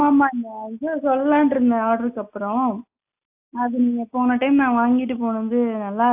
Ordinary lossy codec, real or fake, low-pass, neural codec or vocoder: AAC, 32 kbps; real; 3.6 kHz; none